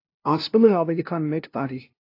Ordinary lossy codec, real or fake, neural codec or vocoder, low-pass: AAC, 32 kbps; fake; codec, 16 kHz, 0.5 kbps, FunCodec, trained on LibriTTS, 25 frames a second; 5.4 kHz